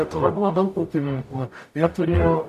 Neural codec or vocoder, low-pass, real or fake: codec, 44.1 kHz, 0.9 kbps, DAC; 14.4 kHz; fake